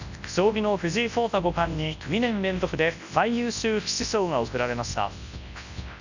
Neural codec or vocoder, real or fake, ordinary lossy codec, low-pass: codec, 24 kHz, 0.9 kbps, WavTokenizer, large speech release; fake; none; 7.2 kHz